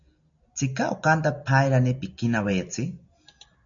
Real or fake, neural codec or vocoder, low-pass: real; none; 7.2 kHz